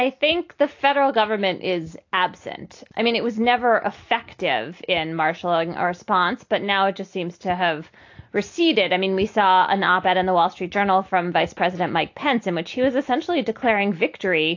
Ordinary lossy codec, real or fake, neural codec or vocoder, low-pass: AAC, 48 kbps; real; none; 7.2 kHz